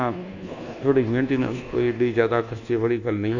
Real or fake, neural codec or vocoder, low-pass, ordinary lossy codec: fake; codec, 24 kHz, 1.2 kbps, DualCodec; 7.2 kHz; none